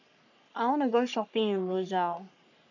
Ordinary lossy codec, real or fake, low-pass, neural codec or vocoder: none; fake; 7.2 kHz; codec, 44.1 kHz, 3.4 kbps, Pupu-Codec